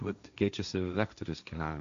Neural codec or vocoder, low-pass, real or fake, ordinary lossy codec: codec, 16 kHz, 1.1 kbps, Voila-Tokenizer; 7.2 kHz; fake; MP3, 64 kbps